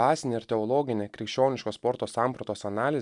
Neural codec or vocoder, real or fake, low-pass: none; real; 10.8 kHz